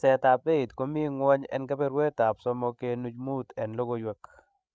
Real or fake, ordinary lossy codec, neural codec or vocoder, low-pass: fake; none; codec, 16 kHz, 16 kbps, FreqCodec, larger model; none